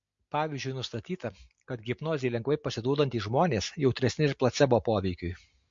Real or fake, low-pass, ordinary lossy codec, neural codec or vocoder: real; 7.2 kHz; MP3, 48 kbps; none